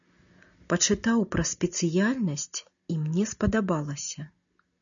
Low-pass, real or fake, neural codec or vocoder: 7.2 kHz; real; none